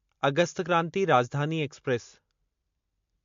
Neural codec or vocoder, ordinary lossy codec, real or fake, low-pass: none; MP3, 48 kbps; real; 7.2 kHz